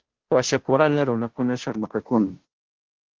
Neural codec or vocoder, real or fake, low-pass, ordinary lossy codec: codec, 16 kHz, 0.5 kbps, FunCodec, trained on Chinese and English, 25 frames a second; fake; 7.2 kHz; Opus, 16 kbps